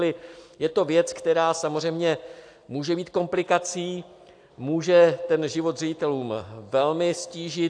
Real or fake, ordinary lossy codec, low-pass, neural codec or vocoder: real; AAC, 64 kbps; 9.9 kHz; none